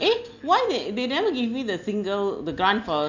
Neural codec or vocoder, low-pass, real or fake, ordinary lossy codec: none; 7.2 kHz; real; none